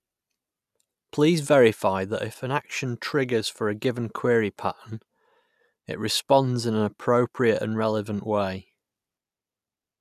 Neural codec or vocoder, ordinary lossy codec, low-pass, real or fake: none; none; 14.4 kHz; real